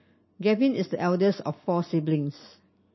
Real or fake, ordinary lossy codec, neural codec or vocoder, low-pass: real; MP3, 24 kbps; none; 7.2 kHz